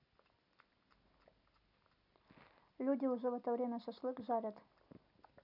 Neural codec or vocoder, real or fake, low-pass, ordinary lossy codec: none; real; 5.4 kHz; none